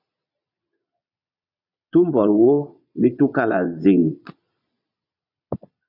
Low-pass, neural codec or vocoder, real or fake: 5.4 kHz; vocoder, 22.05 kHz, 80 mel bands, Vocos; fake